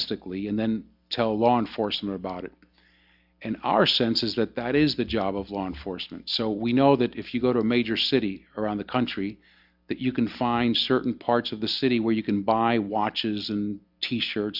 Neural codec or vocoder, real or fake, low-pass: none; real; 5.4 kHz